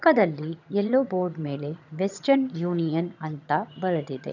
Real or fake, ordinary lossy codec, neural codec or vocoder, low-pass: fake; none; vocoder, 22.05 kHz, 80 mel bands, HiFi-GAN; 7.2 kHz